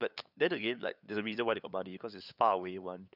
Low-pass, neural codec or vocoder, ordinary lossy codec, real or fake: 5.4 kHz; codec, 16 kHz, 8 kbps, FunCodec, trained on LibriTTS, 25 frames a second; none; fake